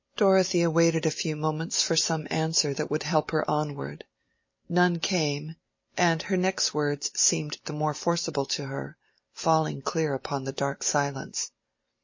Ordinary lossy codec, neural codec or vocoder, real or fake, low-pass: MP3, 32 kbps; none; real; 7.2 kHz